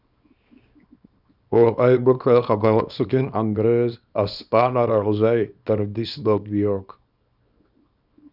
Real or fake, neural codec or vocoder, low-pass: fake; codec, 24 kHz, 0.9 kbps, WavTokenizer, small release; 5.4 kHz